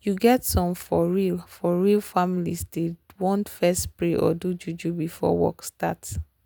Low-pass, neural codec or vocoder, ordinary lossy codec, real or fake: none; none; none; real